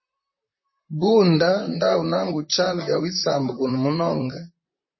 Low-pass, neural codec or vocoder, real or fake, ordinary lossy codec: 7.2 kHz; vocoder, 44.1 kHz, 128 mel bands, Pupu-Vocoder; fake; MP3, 24 kbps